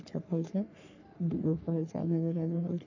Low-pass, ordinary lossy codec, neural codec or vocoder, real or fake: 7.2 kHz; none; codec, 44.1 kHz, 3.4 kbps, Pupu-Codec; fake